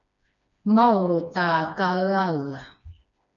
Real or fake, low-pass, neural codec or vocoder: fake; 7.2 kHz; codec, 16 kHz, 2 kbps, FreqCodec, smaller model